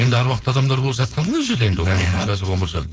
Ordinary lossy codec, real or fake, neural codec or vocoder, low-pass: none; fake; codec, 16 kHz, 4.8 kbps, FACodec; none